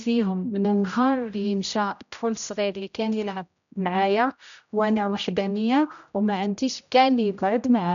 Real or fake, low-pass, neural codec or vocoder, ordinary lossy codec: fake; 7.2 kHz; codec, 16 kHz, 0.5 kbps, X-Codec, HuBERT features, trained on general audio; none